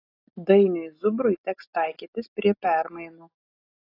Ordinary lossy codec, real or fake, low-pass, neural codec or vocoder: AAC, 32 kbps; real; 5.4 kHz; none